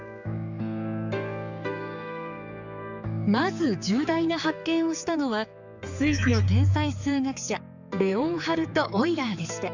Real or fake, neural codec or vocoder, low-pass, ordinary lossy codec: fake; codec, 16 kHz, 4 kbps, X-Codec, HuBERT features, trained on general audio; 7.2 kHz; none